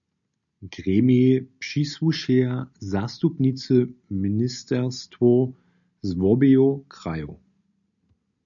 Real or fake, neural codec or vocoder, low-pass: real; none; 7.2 kHz